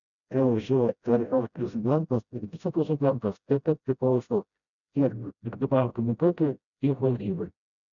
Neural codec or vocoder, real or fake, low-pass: codec, 16 kHz, 0.5 kbps, FreqCodec, smaller model; fake; 7.2 kHz